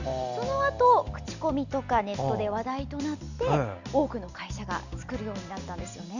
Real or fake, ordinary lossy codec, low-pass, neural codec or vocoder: real; none; 7.2 kHz; none